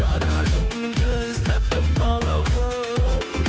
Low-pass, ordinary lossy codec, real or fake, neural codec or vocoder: none; none; fake; codec, 16 kHz, 0.9 kbps, LongCat-Audio-Codec